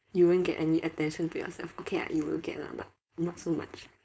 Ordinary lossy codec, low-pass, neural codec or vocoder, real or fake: none; none; codec, 16 kHz, 4.8 kbps, FACodec; fake